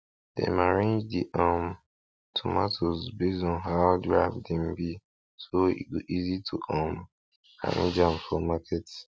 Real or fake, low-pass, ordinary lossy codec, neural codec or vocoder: real; none; none; none